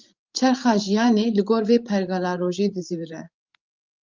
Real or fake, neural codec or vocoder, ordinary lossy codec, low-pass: fake; codec, 16 kHz, 16 kbps, FreqCodec, larger model; Opus, 24 kbps; 7.2 kHz